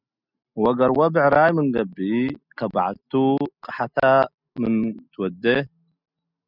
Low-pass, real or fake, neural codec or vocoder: 5.4 kHz; real; none